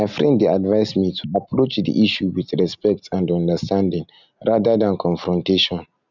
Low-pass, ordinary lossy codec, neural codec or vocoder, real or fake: 7.2 kHz; none; none; real